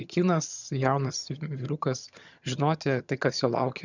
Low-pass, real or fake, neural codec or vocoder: 7.2 kHz; fake; vocoder, 22.05 kHz, 80 mel bands, HiFi-GAN